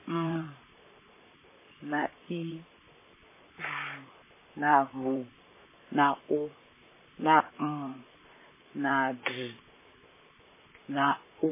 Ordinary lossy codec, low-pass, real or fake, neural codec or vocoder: MP3, 16 kbps; 3.6 kHz; fake; vocoder, 44.1 kHz, 128 mel bands, Pupu-Vocoder